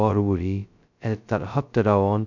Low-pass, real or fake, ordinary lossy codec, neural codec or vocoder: 7.2 kHz; fake; none; codec, 16 kHz, 0.2 kbps, FocalCodec